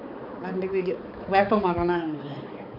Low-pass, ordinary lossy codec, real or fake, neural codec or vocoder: 5.4 kHz; none; fake; codec, 16 kHz, 4 kbps, X-Codec, HuBERT features, trained on balanced general audio